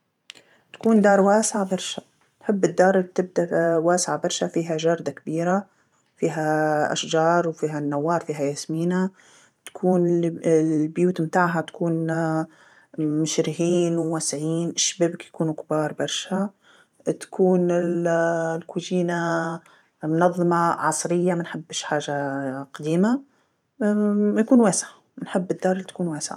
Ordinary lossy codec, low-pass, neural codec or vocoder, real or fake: none; 19.8 kHz; vocoder, 44.1 kHz, 128 mel bands every 512 samples, BigVGAN v2; fake